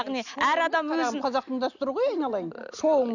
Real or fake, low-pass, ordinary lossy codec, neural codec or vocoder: real; 7.2 kHz; none; none